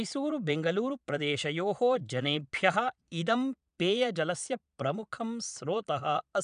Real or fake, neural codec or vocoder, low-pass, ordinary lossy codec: fake; vocoder, 22.05 kHz, 80 mel bands, Vocos; 9.9 kHz; none